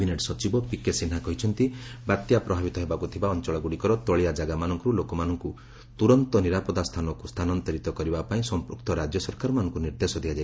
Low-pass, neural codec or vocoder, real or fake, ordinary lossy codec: none; none; real; none